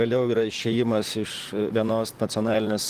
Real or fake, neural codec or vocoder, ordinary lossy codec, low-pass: fake; vocoder, 44.1 kHz, 128 mel bands, Pupu-Vocoder; Opus, 24 kbps; 14.4 kHz